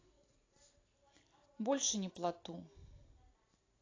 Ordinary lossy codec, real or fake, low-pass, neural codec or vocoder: AAC, 32 kbps; real; 7.2 kHz; none